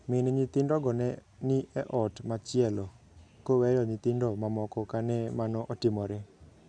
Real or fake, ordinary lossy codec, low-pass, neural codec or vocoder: real; none; 9.9 kHz; none